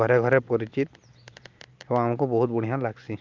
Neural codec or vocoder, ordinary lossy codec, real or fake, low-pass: none; Opus, 24 kbps; real; 7.2 kHz